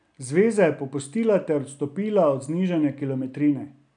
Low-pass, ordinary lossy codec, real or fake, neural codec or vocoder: 9.9 kHz; none; real; none